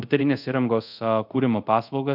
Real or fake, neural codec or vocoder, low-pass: fake; codec, 24 kHz, 0.5 kbps, DualCodec; 5.4 kHz